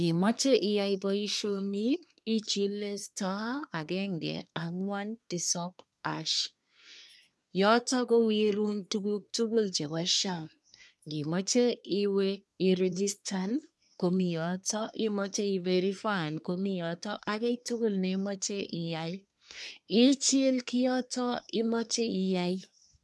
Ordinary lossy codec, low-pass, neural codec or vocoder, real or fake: none; none; codec, 24 kHz, 1 kbps, SNAC; fake